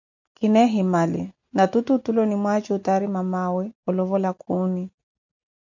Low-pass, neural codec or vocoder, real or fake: 7.2 kHz; none; real